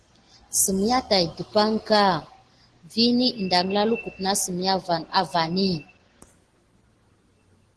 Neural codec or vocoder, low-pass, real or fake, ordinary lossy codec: none; 10.8 kHz; real; Opus, 16 kbps